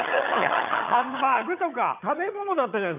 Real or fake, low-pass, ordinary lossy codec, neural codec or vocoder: fake; 3.6 kHz; none; vocoder, 22.05 kHz, 80 mel bands, HiFi-GAN